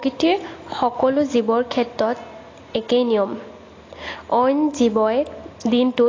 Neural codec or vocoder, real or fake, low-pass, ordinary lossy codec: none; real; 7.2 kHz; AAC, 32 kbps